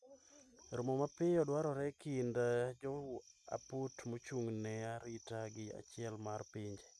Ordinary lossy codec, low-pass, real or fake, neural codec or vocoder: none; none; real; none